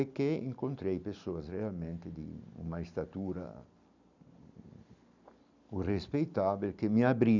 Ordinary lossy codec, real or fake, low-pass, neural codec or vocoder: none; real; 7.2 kHz; none